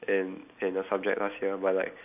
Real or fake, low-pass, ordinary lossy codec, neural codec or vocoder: real; 3.6 kHz; none; none